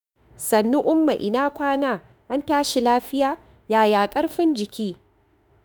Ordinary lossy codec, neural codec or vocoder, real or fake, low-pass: none; autoencoder, 48 kHz, 32 numbers a frame, DAC-VAE, trained on Japanese speech; fake; none